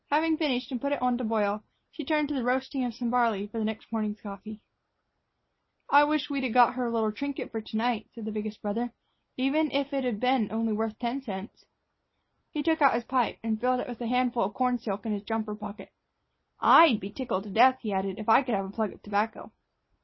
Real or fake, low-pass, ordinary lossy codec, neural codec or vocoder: real; 7.2 kHz; MP3, 24 kbps; none